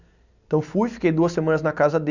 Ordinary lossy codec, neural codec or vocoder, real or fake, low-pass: none; none; real; 7.2 kHz